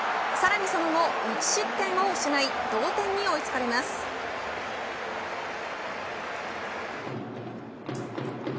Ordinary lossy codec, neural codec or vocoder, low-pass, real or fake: none; none; none; real